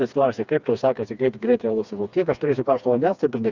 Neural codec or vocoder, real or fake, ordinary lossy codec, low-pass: codec, 16 kHz, 2 kbps, FreqCodec, smaller model; fake; Opus, 64 kbps; 7.2 kHz